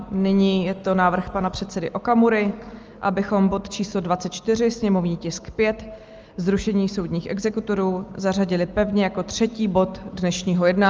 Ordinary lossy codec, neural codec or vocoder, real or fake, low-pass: Opus, 24 kbps; none; real; 7.2 kHz